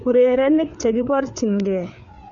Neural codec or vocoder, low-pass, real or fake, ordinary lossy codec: codec, 16 kHz, 4 kbps, FreqCodec, larger model; 7.2 kHz; fake; none